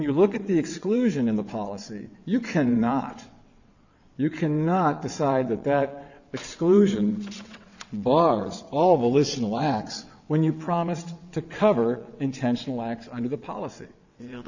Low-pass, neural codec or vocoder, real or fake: 7.2 kHz; vocoder, 22.05 kHz, 80 mel bands, WaveNeXt; fake